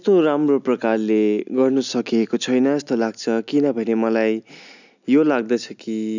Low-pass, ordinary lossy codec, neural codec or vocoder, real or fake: 7.2 kHz; none; none; real